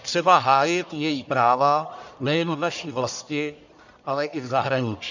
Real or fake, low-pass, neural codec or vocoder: fake; 7.2 kHz; codec, 44.1 kHz, 1.7 kbps, Pupu-Codec